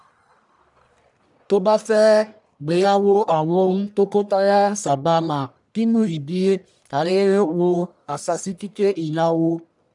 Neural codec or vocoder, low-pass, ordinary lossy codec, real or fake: codec, 44.1 kHz, 1.7 kbps, Pupu-Codec; 10.8 kHz; none; fake